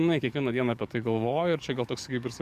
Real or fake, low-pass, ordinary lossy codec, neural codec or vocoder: fake; 14.4 kHz; Opus, 64 kbps; codec, 44.1 kHz, 7.8 kbps, DAC